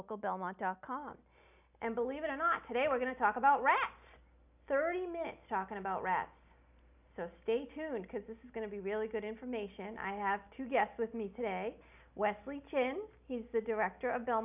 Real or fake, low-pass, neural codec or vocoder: real; 3.6 kHz; none